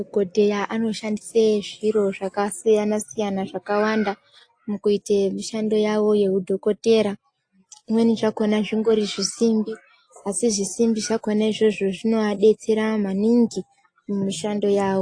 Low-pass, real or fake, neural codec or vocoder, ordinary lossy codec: 9.9 kHz; real; none; AAC, 48 kbps